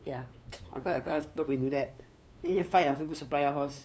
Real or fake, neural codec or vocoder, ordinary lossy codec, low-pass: fake; codec, 16 kHz, 2 kbps, FunCodec, trained on LibriTTS, 25 frames a second; none; none